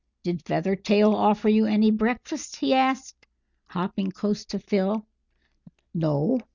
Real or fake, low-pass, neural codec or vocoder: real; 7.2 kHz; none